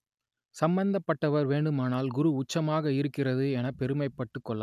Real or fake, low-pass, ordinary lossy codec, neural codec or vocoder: real; 14.4 kHz; none; none